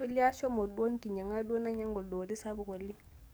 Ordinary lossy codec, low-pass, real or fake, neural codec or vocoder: none; none; fake; codec, 44.1 kHz, 7.8 kbps, DAC